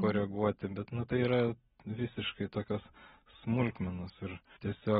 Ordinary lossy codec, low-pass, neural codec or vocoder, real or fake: AAC, 16 kbps; 7.2 kHz; none; real